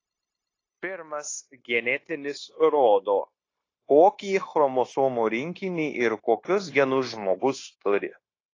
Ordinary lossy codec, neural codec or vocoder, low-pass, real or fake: AAC, 32 kbps; codec, 16 kHz, 0.9 kbps, LongCat-Audio-Codec; 7.2 kHz; fake